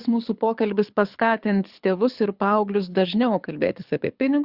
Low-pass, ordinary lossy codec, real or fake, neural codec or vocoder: 5.4 kHz; Opus, 64 kbps; fake; codec, 24 kHz, 6 kbps, HILCodec